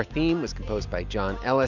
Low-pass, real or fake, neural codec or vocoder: 7.2 kHz; real; none